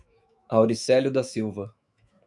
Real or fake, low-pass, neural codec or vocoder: fake; 10.8 kHz; autoencoder, 48 kHz, 128 numbers a frame, DAC-VAE, trained on Japanese speech